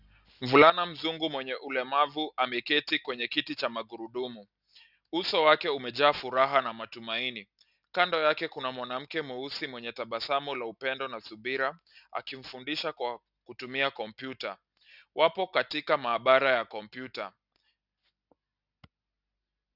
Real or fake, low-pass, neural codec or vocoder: real; 5.4 kHz; none